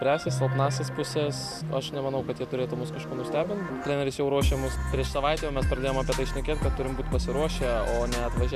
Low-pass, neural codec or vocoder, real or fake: 14.4 kHz; none; real